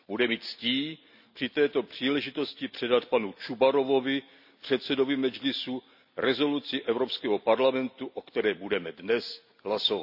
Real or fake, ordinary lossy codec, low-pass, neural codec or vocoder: real; none; 5.4 kHz; none